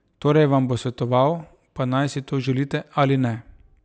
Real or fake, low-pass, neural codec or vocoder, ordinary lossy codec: real; none; none; none